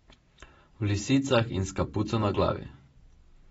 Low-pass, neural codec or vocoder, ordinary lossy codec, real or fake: 19.8 kHz; none; AAC, 24 kbps; real